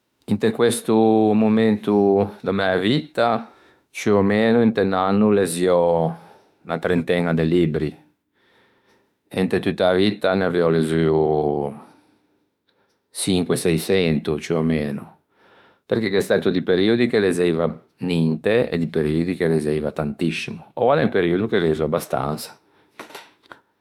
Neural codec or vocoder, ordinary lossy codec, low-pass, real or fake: autoencoder, 48 kHz, 32 numbers a frame, DAC-VAE, trained on Japanese speech; none; 19.8 kHz; fake